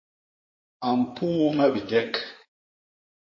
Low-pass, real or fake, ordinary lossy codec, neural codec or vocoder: 7.2 kHz; fake; MP3, 32 kbps; codec, 16 kHz in and 24 kHz out, 2.2 kbps, FireRedTTS-2 codec